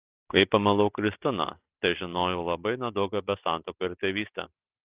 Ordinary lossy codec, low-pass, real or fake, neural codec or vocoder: Opus, 24 kbps; 3.6 kHz; real; none